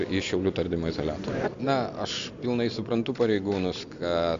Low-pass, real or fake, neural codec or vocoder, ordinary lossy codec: 7.2 kHz; real; none; AAC, 64 kbps